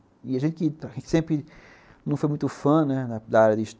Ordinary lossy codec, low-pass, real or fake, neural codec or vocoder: none; none; real; none